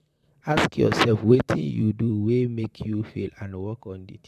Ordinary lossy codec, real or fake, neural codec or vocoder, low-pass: none; fake; vocoder, 44.1 kHz, 128 mel bands, Pupu-Vocoder; 14.4 kHz